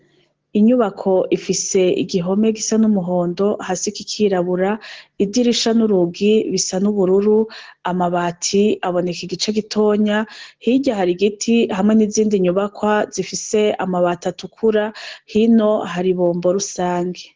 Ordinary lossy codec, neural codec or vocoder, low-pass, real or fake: Opus, 16 kbps; none; 7.2 kHz; real